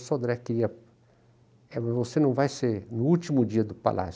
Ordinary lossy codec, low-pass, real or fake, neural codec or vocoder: none; none; real; none